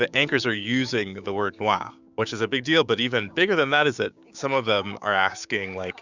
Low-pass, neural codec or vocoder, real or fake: 7.2 kHz; codec, 16 kHz, 6 kbps, DAC; fake